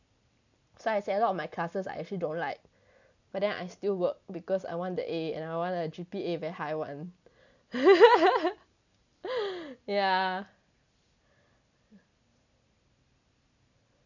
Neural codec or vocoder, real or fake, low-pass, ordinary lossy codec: none; real; 7.2 kHz; none